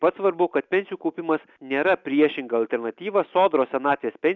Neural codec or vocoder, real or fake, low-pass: none; real; 7.2 kHz